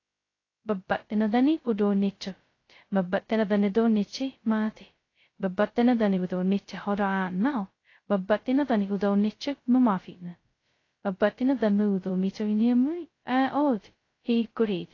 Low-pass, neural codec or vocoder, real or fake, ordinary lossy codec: 7.2 kHz; codec, 16 kHz, 0.2 kbps, FocalCodec; fake; AAC, 32 kbps